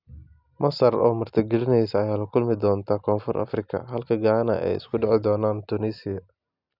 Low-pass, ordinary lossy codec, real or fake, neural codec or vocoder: 5.4 kHz; none; real; none